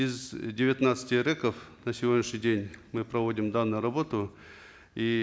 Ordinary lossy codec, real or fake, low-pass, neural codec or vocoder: none; real; none; none